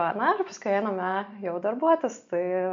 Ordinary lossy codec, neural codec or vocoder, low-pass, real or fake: MP3, 48 kbps; none; 7.2 kHz; real